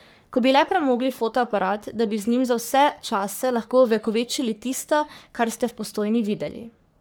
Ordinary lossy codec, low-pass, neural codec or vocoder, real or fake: none; none; codec, 44.1 kHz, 3.4 kbps, Pupu-Codec; fake